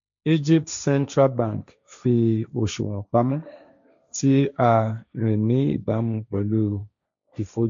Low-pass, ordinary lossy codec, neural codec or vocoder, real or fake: 7.2 kHz; MP3, 64 kbps; codec, 16 kHz, 1.1 kbps, Voila-Tokenizer; fake